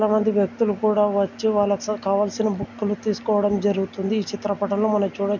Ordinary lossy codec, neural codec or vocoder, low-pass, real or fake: none; none; 7.2 kHz; real